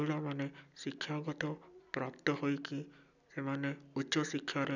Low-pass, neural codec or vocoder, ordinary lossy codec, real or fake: 7.2 kHz; none; none; real